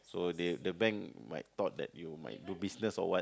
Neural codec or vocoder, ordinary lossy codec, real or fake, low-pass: none; none; real; none